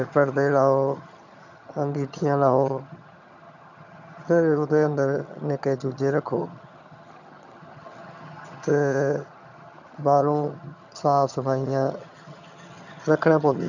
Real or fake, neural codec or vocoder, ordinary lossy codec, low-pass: fake; vocoder, 22.05 kHz, 80 mel bands, HiFi-GAN; none; 7.2 kHz